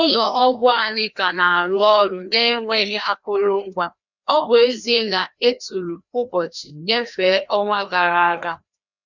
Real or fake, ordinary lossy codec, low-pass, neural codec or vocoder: fake; none; 7.2 kHz; codec, 16 kHz, 1 kbps, FreqCodec, larger model